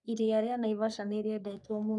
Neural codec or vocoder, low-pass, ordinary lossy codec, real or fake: codec, 44.1 kHz, 3.4 kbps, Pupu-Codec; 10.8 kHz; none; fake